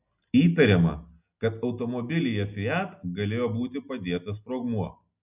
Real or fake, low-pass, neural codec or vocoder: real; 3.6 kHz; none